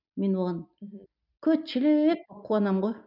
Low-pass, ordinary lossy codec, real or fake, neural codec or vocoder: 5.4 kHz; none; real; none